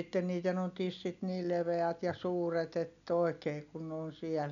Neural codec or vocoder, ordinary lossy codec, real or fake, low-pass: none; none; real; 7.2 kHz